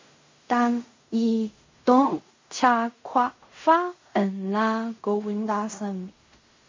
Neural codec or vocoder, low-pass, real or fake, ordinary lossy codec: codec, 16 kHz in and 24 kHz out, 0.4 kbps, LongCat-Audio-Codec, fine tuned four codebook decoder; 7.2 kHz; fake; MP3, 32 kbps